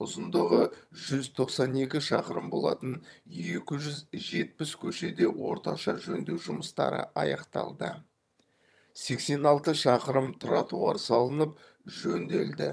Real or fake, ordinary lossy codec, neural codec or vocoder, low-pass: fake; none; vocoder, 22.05 kHz, 80 mel bands, HiFi-GAN; none